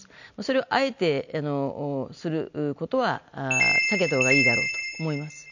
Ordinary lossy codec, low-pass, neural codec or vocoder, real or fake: none; 7.2 kHz; none; real